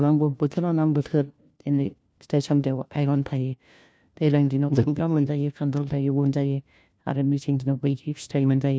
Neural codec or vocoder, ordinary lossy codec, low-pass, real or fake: codec, 16 kHz, 1 kbps, FunCodec, trained on LibriTTS, 50 frames a second; none; none; fake